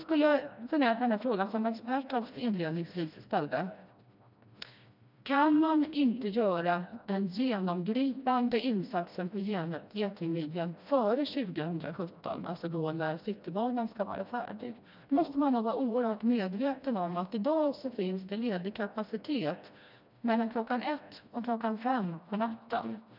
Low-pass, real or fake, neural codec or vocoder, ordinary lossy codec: 5.4 kHz; fake; codec, 16 kHz, 1 kbps, FreqCodec, smaller model; none